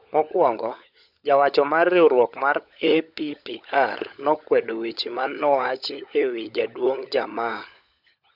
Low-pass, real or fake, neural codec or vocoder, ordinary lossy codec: 5.4 kHz; fake; codec, 16 kHz, 16 kbps, FunCodec, trained on LibriTTS, 50 frames a second; none